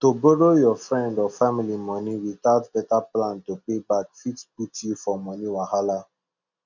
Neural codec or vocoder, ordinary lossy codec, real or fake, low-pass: none; none; real; 7.2 kHz